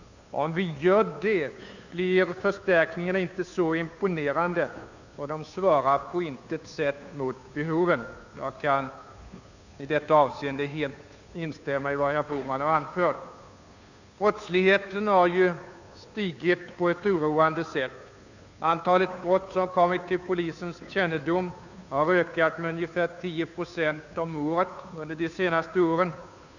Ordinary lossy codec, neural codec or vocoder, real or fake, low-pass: none; codec, 16 kHz, 2 kbps, FunCodec, trained on Chinese and English, 25 frames a second; fake; 7.2 kHz